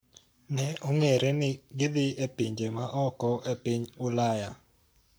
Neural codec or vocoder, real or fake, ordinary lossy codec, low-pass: codec, 44.1 kHz, 7.8 kbps, Pupu-Codec; fake; none; none